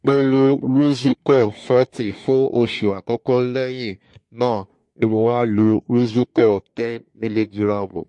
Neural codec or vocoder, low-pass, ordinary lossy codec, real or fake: codec, 24 kHz, 1 kbps, SNAC; 10.8 kHz; MP3, 48 kbps; fake